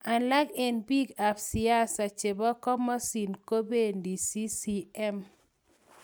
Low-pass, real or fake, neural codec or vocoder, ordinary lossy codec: none; real; none; none